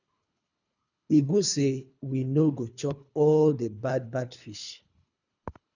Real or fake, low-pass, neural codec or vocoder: fake; 7.2 kHz; codec, 24 kHz, 3 kbps, HILCodec